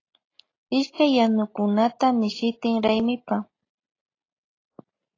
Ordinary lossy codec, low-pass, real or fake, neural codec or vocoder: AAC, 32 kbps; 7.2 kHz; real; none